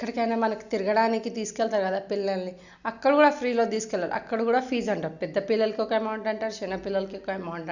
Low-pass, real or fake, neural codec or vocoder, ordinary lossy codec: 7.2 kHz; real; none; none